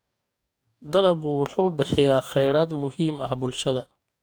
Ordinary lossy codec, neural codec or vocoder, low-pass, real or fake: none; codec, 44.1 kHz, 2.6 kbps, DAC; none; fake